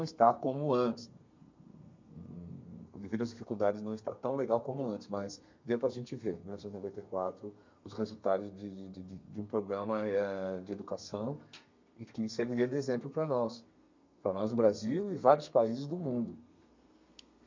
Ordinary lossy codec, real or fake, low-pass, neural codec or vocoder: MP3, 48 kbps; fake; 7.2 kHz; codec, 32 kHz, 1.9 kbps, SNAC